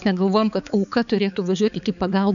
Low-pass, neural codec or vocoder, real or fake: 7.2 kHz; codec, 16 kHz, 4 kbps, X-Codec, HuBERT features, trained on balanced general audio; fake